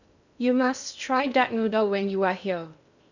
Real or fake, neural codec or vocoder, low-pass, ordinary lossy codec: fake; codec, 16 kHz in and 24 kHz out, 0.6 kbps, FocalCodec, streaming, 2048 codes; 7.2 kHz; none